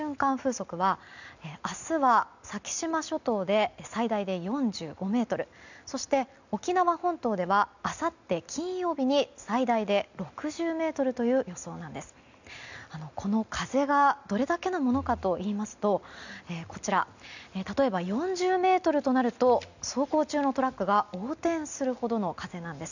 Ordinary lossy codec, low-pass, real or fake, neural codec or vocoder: none; 7.2 kHz; real; none